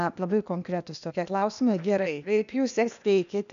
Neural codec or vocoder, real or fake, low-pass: codec, 16 kHz, 0.8 kbps, ZipCodec; fake; 7.2 kHz